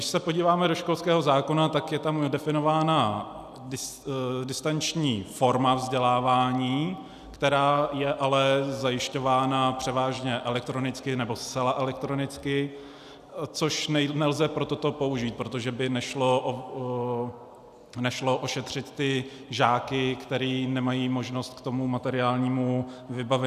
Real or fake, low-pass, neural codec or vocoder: real; 14.4 kHz; none